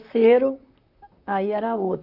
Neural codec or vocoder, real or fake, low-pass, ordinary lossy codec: vocoder, 44.1 kHz, 128 mel bands, Pupu-Vocoder; fake; 5.4 kHz; none